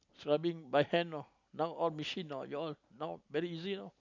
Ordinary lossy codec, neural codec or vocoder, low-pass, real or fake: none; none; 7.2 kHz; real